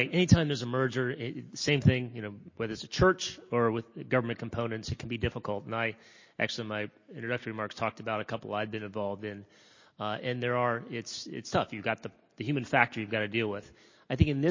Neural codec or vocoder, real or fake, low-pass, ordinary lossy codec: none; real; 7.2 kHz; MP3, 32 kbps